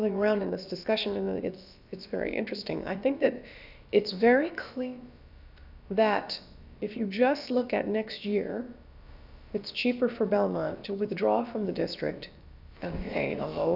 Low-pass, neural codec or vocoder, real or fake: 5.4 kHz; codec, 16 kHz, about 1 kbps, DyCAST, with the encoder's durations; fake